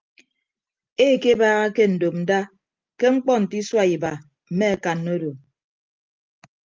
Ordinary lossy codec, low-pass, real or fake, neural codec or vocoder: Opus, 24 kbps; 7.2 kHz; real; none